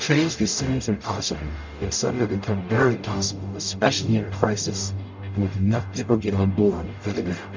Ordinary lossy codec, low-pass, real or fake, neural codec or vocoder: MP3, 64 kbps; 7.2 kHz; fake; codec, 44.1 kHz, 0.9 kbps, DAC